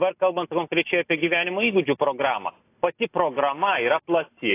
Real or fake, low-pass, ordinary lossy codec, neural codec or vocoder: real; 3.6 kHz; AAC, 24 kbps; none